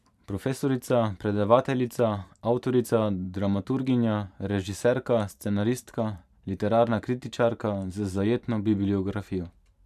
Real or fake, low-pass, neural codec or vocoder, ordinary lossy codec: fake; 14.4 kHz; vocoder, 44.1 kHz, 128 mel bands every 512 samples, BigVGAN v2; none